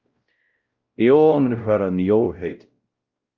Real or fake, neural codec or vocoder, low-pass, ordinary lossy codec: fake; codec, 16 kHz, 0.5 kbps, X-Codec, WavLM features, trained on Multilingual LibriSpeech; 7.2 kHz; Opus, 32 kbps